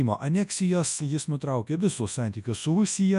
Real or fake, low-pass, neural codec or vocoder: fake; 10.8 kHz; codec, 24 kHz, 0.9 kbps, WavTokenizer, large speech release